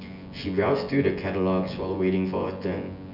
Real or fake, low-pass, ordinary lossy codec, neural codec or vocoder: fake; 5.4 kHz; none; vocoder, 24 kHz, 100 mel bands, Vocos